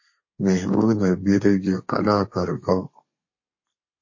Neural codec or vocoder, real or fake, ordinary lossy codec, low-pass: codec, 32 kHz, 1.9 kbps, SNAC; fake; MP3, 32 kbps; 7.2 kHz